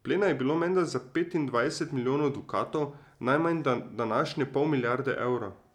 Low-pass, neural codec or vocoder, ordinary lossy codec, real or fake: 19.8 kHz; none; none; real